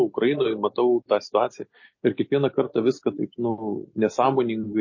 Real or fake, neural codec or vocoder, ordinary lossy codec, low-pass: real; none; MP3, 32 kbps; 7.2 kHz